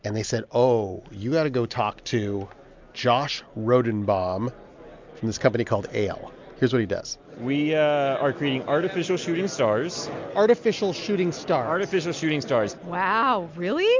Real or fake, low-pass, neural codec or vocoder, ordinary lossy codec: real; 7.2 kHz; none; MP3, 64 kbps